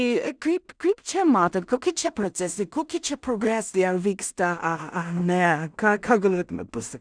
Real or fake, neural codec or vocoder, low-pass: fake; codec, 16 kHz in and 24 kHz out, 0.4 kbps, LongCat-Audio-Codec, two codebook decoder; 9.9 kHz